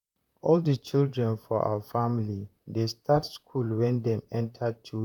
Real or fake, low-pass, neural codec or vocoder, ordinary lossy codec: fake; 19.8 kHz; vocoder, 44.1 kHz, 128 mel bands, Pupu-Vocoder; none